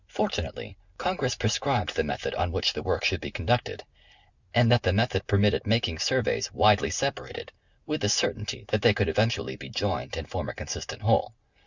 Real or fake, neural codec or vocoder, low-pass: real; none; 7.2 kHz